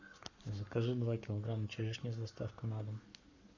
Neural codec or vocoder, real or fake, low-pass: codec, 44.1 kHz, 7.8 kbps, Pupu-Codec; fake; 7.2 kHz